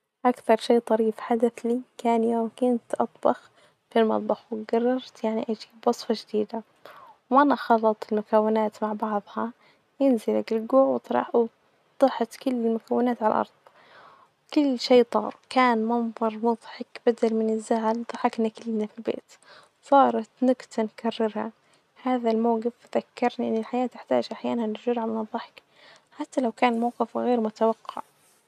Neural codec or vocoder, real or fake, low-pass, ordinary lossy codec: none; real; 14.4 kHz; none